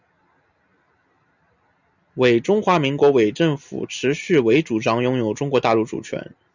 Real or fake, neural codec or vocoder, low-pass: real; none; 7.2 kHz